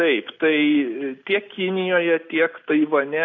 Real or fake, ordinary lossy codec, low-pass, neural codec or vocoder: real; AAC, 48 kbps; 7.2 kHz; none